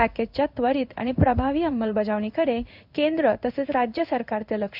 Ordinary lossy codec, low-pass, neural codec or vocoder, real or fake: none; 5.4 kHz; codec, 16 kHz in and 24 kHz out, 1 kbps, XY-Tokenizer; fake